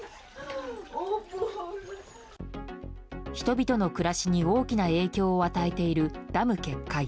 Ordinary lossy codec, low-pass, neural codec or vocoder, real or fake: none; none; none; real